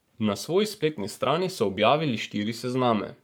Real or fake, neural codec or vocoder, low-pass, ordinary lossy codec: fake; codec, 44.1 kHz, 7.8 kbps, Pupu-Codec; none; none